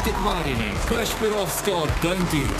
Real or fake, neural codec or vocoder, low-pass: fake; codec, 32 kHz, 1.9 kbps, SNAC; 14.4 kHz